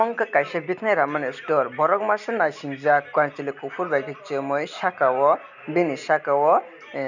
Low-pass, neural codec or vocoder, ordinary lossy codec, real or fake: 7.2 kHz; none; none; real